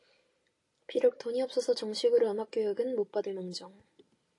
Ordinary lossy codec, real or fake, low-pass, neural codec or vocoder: AAC, 48 kbps; real; 10.8 kHz; none